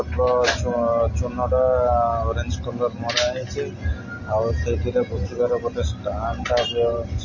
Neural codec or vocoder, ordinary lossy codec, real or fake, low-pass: none; MP3, 32 kbps; real; 7.2 kHz